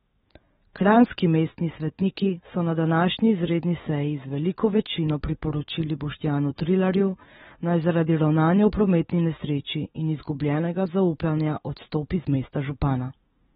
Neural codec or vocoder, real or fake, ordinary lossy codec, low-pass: autoencoder, 48 kHz, 128 numbers a frame, DAC-VAE, trained on Japanese speech; fake; AAC, 16 kbps; 19.8 kHz